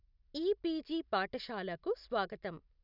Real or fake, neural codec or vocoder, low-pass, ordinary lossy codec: real; none; 5.4 kHz; none